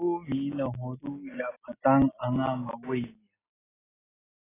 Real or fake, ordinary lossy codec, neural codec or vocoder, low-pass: real; AAC, 16 kbps; none; 3.6 kHz